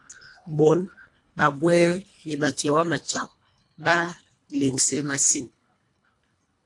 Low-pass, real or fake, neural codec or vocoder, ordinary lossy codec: 10.8 kHz; fake; codec, 24 kHz, 1.5 kbps, HILCodec; AAC, 48 kbps